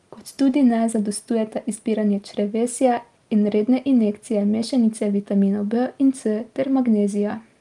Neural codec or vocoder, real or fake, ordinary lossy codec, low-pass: none; real; Opus, 32 kbps; 10.8 kHz